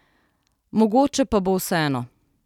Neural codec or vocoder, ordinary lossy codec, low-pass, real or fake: none; none; 19.8 kHz; real